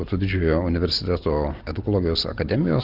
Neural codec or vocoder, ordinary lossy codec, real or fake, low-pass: none; Opus, 32 kbps; real; 5.4 kHz